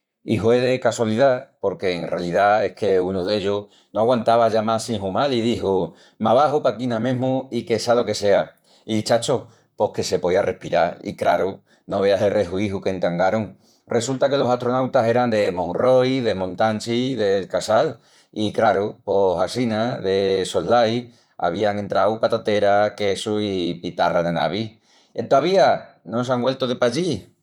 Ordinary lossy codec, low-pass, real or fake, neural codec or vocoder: none; 19.8 kHz; fake; vocoder, 44.1 kHz, 128 mel bands, Pupu-Vocoder